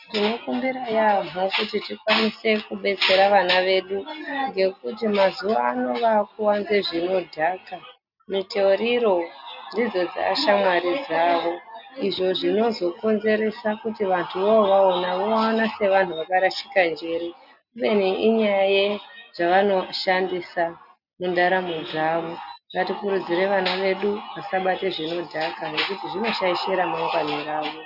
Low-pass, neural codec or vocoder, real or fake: 5.4 kHz; none; real